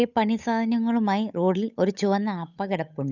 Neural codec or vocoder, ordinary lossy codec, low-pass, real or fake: codec, 16 kHz, 8 kbps, FunCodec, trained on Chinese and English, 25 frames a second; none; 7.2 kHz; fake